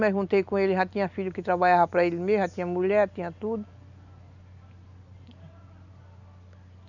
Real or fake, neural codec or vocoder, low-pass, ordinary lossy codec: real; none; 7.2 kHz; none